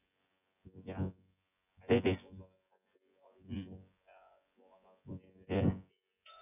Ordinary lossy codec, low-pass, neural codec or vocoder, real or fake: none; 3.6 kHz; vocoder, 24 kHz, 100 mel bands, Vocos; fake